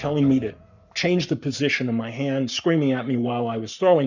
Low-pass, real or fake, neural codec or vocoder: 7.2 kHz; fake; codec, 44.1 kHz, 7.8 kbps, Pupu-Codec